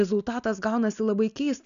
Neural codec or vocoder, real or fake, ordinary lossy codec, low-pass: none; real; MP3, 96 kbps; 7.2 kHz